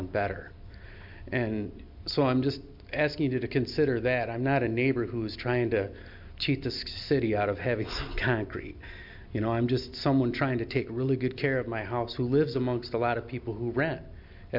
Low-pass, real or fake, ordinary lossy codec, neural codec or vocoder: 5.4 kHz; real; MP3, 48 kbps; none